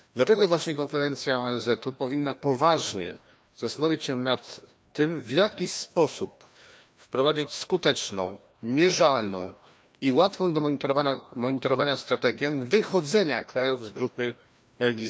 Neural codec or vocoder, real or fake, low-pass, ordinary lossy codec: codec, 16 kHz, 1 kbps, FreqCodec, larger model; fake; none; none